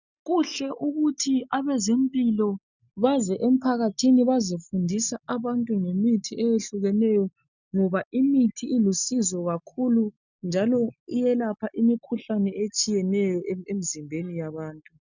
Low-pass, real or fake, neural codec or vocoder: 7.2 kHz; real; none